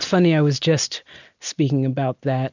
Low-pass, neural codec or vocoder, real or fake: 7.2 kHz; none; real